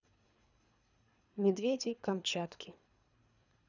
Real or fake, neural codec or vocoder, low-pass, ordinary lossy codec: fake; codec, 24 kHz, 3 kbps, HILCodec; 7.2 kHz; none